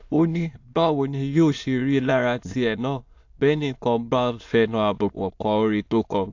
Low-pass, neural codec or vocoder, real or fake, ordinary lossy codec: 7.2 kHz; autoencoder, 22.05 kHz, a latent of 192 numbers a frame, VITS, trained on many speakers; fake; AAC, 48 kbps